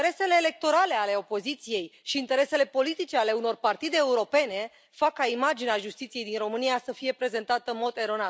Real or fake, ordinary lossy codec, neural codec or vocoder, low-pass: real; none; none; none